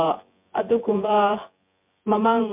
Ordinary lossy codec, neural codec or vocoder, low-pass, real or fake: MP3, 32 kbps; vocoder, 24 kHz, 100 mel bands, Vocos; 3.6 kHz; fake